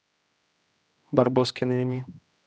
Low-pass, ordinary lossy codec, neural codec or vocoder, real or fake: none; none; codec, 16 kHz, 1 kbps, X-Codec, HuBERT features, trained on general audio; fake